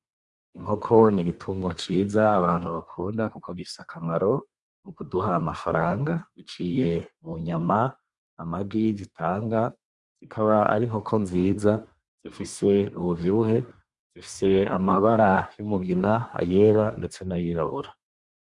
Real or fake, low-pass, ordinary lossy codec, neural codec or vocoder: fake; 10.8 kHz; Opus, 64 kbps; codec, 24 kHz, 1 kbps, SNAC